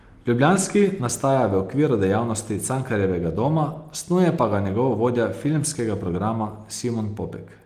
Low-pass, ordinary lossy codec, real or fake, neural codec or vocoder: 14.4 kHz; Opus, 24 kbps; real; none